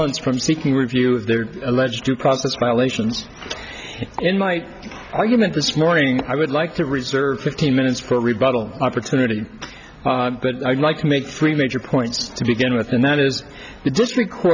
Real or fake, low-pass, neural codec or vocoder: real; 7.2 kHz; none